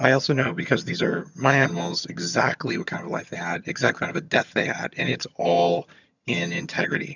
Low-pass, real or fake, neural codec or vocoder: 7.2 kHz; fake; vocoder, 22.05 kHz, 80 mel bands, HiFi-GAN